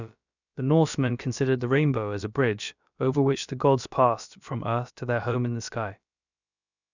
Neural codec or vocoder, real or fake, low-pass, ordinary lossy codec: codec, 16 kHz, about 1 kbps, DyCAST, with the encoder's durations; fake; 7.2 kHz; none